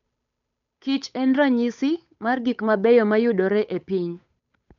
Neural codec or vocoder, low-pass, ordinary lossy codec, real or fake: codec, 16 kHz, 8 kbps, FunCodec, trained on Chinese and English, 25 frames a second; 7.2 kHz; none; fake